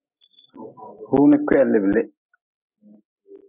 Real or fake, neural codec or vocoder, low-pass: real; none; 3.6 kHz